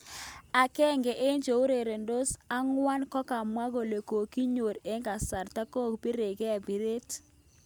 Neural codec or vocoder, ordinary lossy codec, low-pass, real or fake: none; none; none; real